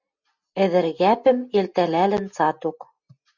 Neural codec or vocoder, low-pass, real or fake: none; 7.2 kHz; real